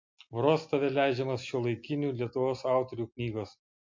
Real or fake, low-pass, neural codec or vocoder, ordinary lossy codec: real; 7.2 kHz; none; MP3, 48 kbps